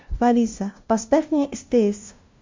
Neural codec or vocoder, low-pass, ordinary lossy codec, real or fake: codec, 16 kHz, 0.5 kbps, FunCodec, trained on LibriTTS, 25 frames a second; 7.2 kHz; MP3, 48 kbps; fake